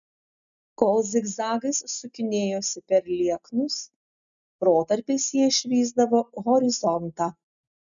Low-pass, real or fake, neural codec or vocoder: 7.2 kHz; real; none